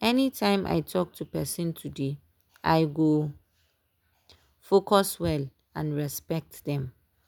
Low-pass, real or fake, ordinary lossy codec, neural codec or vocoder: none; real; none; none